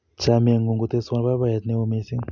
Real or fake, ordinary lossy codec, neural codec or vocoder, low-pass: real; none; none; 7.2 kHz